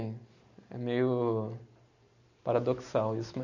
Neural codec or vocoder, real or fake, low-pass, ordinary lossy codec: none; real; 7.2 kHz; none